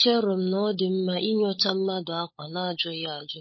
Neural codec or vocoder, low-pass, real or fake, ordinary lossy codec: codec, 16 kHz, 16 kbps, FunCodec, trained on LibriTTS, 50 frames a second; 7.2 kHz; fake; MP3, 24 kbps